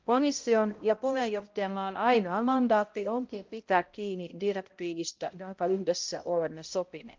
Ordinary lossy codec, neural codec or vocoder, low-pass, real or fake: Opus, 32 kbps; codec, 16 kHz, 0.5 kbps, X-Codec, HuBERT features, trained on balanced general audio; 7.2 kHz; fake